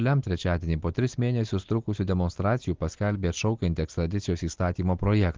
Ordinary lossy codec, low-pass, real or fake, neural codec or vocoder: Opus, 16 kbps; 7.2 kHz; real; none